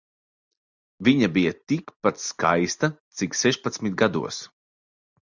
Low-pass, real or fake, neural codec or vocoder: 7.2 kHz; real; none